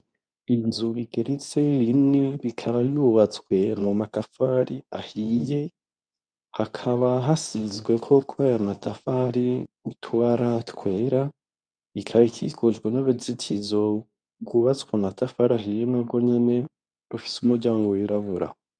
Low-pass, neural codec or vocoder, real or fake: 9.9 kHz; codec, 24 kHz, 0.9 kbps, WavTokenizer, medium speech release version 2; fake